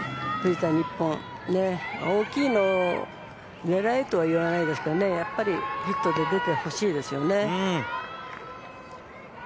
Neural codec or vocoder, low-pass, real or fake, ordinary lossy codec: none; none; real; none